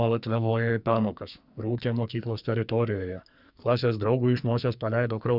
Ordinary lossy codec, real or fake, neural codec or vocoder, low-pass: Opus, 64 kbps; fake; codec, 44.1 kHz, 2.6 kbps, SNAC; 5.4 kHz